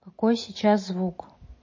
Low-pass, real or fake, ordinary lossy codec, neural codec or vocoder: 7.2 kHz; real; MP3, 32 kbps; none